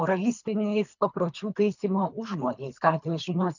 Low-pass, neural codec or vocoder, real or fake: 7.2 kHz; codec, 24 kHz, 3 kbps, HILCodec; fake